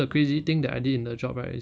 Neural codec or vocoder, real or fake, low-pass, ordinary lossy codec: none; real; none; none